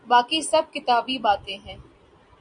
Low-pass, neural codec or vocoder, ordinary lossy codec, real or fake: 9.9 kHz; none; MP3, 64 kbps; real